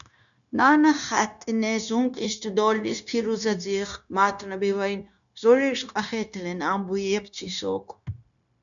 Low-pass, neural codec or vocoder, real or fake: 7.2 kHz; codec, 16 kHz, 0.9 kbps, LongCat-Audio-Codec; fake